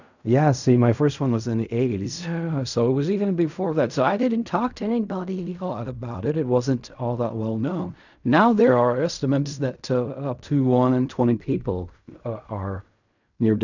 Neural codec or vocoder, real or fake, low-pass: codec, 16 kHz in and 24 kHz out, 0.4 kbps, LongCat-Audio-Codec, fine tuned four codebook decoder; fake; 7.2 kHz